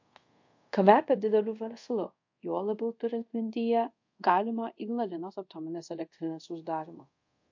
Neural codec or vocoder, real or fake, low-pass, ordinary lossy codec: codec, 24 kHz, 0.5 kbps, DualCodec; fake; 7.2 kHz; MP3, 48 kbps